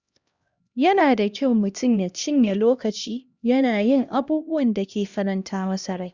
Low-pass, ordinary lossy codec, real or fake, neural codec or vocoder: 7.2 kHz; Opus, 64 kbps; fake; codec, 16 kHz, 1 kbps, X-Codec, HuBERT features, trained on LibriSpeech